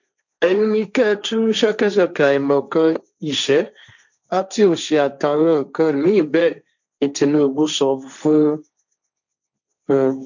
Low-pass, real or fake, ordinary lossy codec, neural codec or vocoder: 7.2 kHz; fake; none; codec, 16 kHz, 1.1 kbps, Voila-Tokenizer